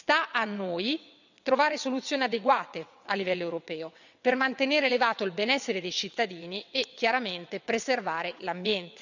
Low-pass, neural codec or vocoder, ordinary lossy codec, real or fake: 7.2 kHz; vocoder, 22.05 kHz, 80 mel bands, WaveNeXt; none; fake